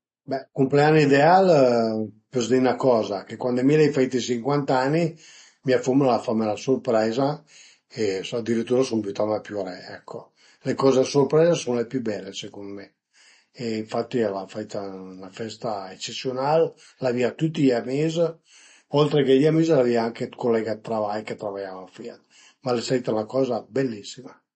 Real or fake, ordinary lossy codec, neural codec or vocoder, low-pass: real; MP3, 32 kbps; none; 9.9 kHz